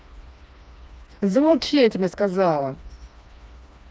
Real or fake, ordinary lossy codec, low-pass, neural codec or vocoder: fake; none; none; codec, 16 kHz, 2 kbps, FreqCodec, smaller model